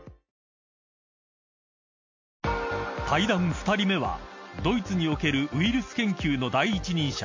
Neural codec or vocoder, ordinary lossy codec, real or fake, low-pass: none; MP3, 48 kbps; real; 7.2 kHz